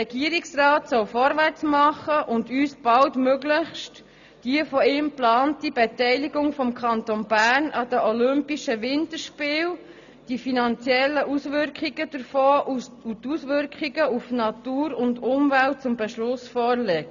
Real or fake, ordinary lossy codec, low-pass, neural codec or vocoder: real; none; 7.2 kHz; none